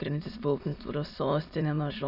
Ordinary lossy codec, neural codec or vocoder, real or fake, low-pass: Opus, 64 kbps; autoencoder, 22.05 kHz, a latent of 192 numbers a frame, VITS, trained on many speakers; fake; 5.4 kHz